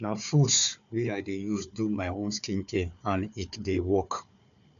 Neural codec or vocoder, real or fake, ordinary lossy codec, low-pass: codec, 16 kHz, 4 kbps, FunCodec, trained on Chinese and English, 50 frames a second; fake; none; 7.2 kHz